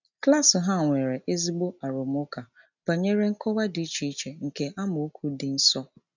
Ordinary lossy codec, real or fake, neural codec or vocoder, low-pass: none; real; none; 7.2 kHz